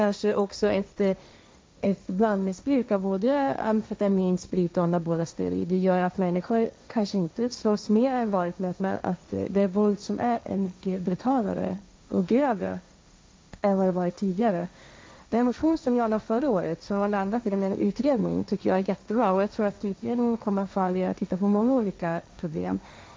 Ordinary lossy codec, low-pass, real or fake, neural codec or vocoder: none; none; fake; codec, 16 kHz, 1.1 kbps, Voila-Tokenizer